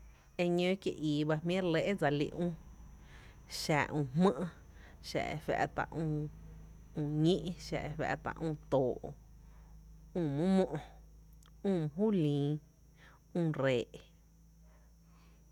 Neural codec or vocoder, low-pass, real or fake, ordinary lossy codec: none; 19.8 kHz; real; none